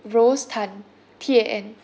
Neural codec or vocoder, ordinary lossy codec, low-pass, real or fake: none; none; none; real